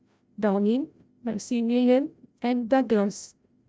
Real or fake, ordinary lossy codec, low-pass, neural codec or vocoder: fake; none; none; codec, 16 kHz, 0.5 kbps, FreqCodec, larger model